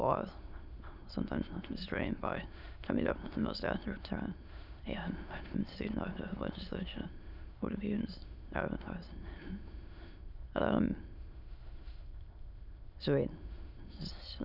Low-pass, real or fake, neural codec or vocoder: 5.4 kHz; fake; autoencoder, 22.05 kHz, a latent of 192 numbers a frame, VITS, trained on many speakers